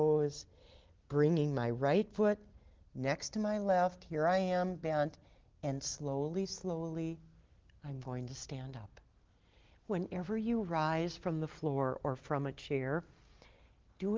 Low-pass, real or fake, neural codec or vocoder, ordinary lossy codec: 7.2 kHz; real; none; Opus, 16 kbps